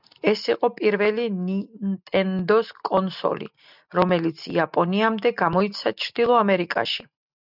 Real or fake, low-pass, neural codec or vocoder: real; 5.4 kHz; none